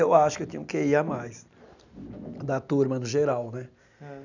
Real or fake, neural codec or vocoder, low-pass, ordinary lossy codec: real; none; 7.2 kHz; none